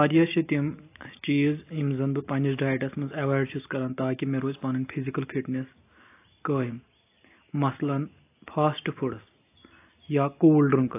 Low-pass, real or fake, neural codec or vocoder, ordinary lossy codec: 3.6 kHz; real; none; AAC, 24 kbps